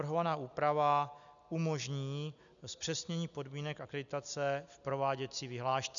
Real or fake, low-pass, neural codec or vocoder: real; 7.2 kHz; none